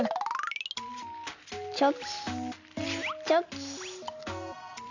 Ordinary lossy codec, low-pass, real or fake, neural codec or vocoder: none; 7.2 kHz; real; none